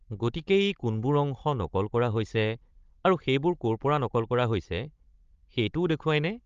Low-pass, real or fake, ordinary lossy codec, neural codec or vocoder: 7.2 kHz; fake; Opus, 32 kbps; codec, 16 kHz, 16 kbps, FunCodec, trained on Chinese and English, 50 frames a second